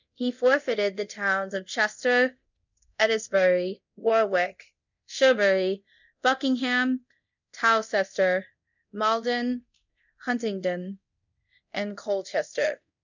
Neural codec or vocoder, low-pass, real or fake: codec, 24 kHz, 0.9 kbps, DualCodec; 7.2 kHz; fake